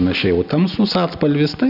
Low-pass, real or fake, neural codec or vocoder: 5.4 kHz; real; none